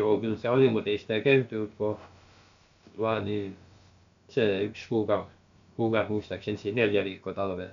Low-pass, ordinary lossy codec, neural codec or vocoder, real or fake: 7.2 kHz; MP3, 64 kbps; codec, 16 kHz, about 1 kbps, DyCAST, with the encoder's durations; fake